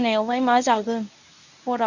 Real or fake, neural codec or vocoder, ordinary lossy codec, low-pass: fake; codec, 24 kHz, 0.9 kbps, WavTokenizer, medium speech release version 1; none; 7.2 kHz